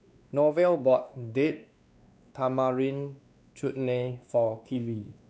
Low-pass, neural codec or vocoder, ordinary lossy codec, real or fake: none; codec, 16 kHz, 2 kbps, X-Codec, WavLM features, trained on Multilingual LibriSpeech; none; fake